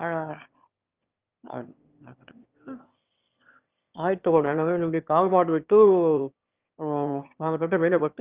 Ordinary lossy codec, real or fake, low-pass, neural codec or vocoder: Opus, 24 kbps; fake; 3.6 kHz; autoencoder, 22.05 kHz, a latent of 192 numbers a frame, VITS, trained on one speaker